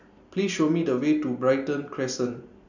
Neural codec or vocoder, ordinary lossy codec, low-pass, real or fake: none; none; 7.2 kHz; real